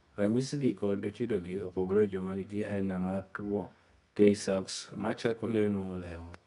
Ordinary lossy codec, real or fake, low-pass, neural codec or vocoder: MP3, 96 kbps; fake; 10.8 kHz; codec, 24 kHz, 0.9 kbps, WavTokenizer, medium music audio release